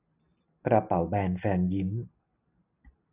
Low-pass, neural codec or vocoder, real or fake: 3.6 kHz; none; real